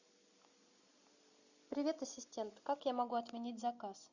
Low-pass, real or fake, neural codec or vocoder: 7.2 kHz; real; none